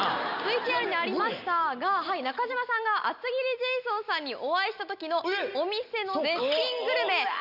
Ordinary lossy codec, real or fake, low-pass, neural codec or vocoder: none; real; 5.4 kHz; none